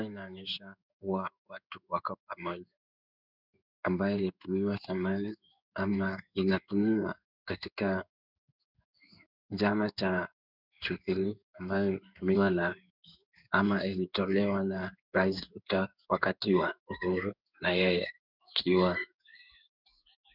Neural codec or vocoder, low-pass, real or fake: codec, 16 kHz in and 24 kHz out, 1 kbps, XY-Tokenizer; 5.4 kHz; fake